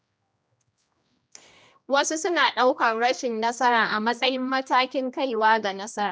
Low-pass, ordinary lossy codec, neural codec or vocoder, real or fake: none; none; codec, 16 kHz, 1 kbps, X-Codec, HuBERT features, trained on general audio; fake